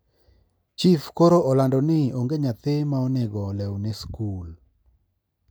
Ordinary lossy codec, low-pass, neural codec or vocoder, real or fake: none; none; none; real